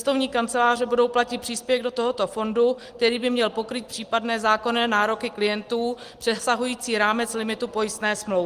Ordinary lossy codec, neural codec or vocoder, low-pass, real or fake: Opus, 24 kbps; none; 14.4 kHz; real